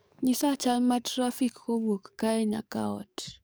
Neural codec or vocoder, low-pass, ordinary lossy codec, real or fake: codec, 44.1 kHz, 7.8 kbps, DAC; none; none; fake